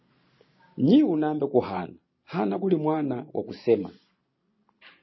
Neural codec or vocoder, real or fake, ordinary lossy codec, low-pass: none; real; MP3, 24 kbps; 7.2 kHz